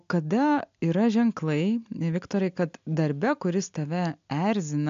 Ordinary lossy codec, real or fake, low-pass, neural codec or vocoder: AAC, 64 kbps; real; 7.2 kHz; none